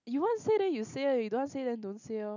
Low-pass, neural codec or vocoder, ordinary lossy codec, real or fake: 7.2 kHz; none; none; real